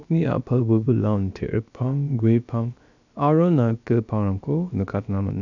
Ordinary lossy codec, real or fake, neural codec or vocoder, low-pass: none; fake; codec, 16 kHz, about 1 kbps, DyCAST, with the encoder's durations; 7.2 kHz